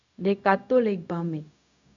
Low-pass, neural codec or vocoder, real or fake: 7.2 kHz; codec, 16 kHz, 0.4 kbps, LongCat-Audio-Codec; fake